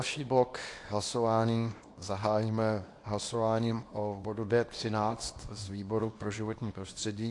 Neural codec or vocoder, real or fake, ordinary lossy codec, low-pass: codec, 24 kHz, 0.9 kbps, WavTokenizer, small release; fake; AAC, 48 kbps; 10.8 kHz